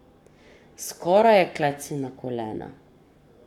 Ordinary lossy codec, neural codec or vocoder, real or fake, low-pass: none; codec, 44.1 kHz, 7.8 kbps, Pupu-Codec; fake; 19.8 kHz